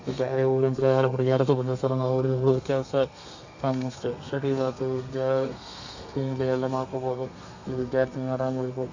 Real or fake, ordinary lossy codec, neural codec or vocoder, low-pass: fake; AAC, 48 kbps; codec, 32 kHz, 1.9 kbps, SNAC; 7.2 kHz